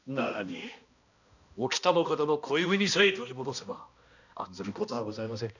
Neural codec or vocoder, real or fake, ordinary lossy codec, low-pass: codec, 16 kHz, 1 kbps, X-Codec, HuBERT features, trained on balanced general audio; fake; none; 7.2 kHz